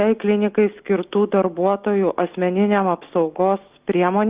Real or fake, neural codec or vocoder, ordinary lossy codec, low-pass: real; none; Opus, 32 kbps; 3.6 kHz